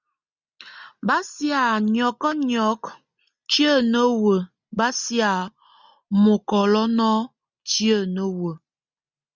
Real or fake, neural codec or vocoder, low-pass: real; none; 7.2 kHz